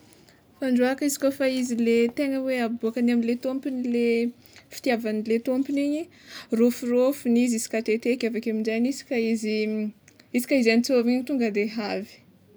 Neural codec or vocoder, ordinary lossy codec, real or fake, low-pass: none; none; real; none